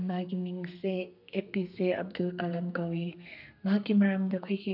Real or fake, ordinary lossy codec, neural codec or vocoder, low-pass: fake; none; codec, 32 kHz, 1.9 kbps, SNAC; 5.4 kHz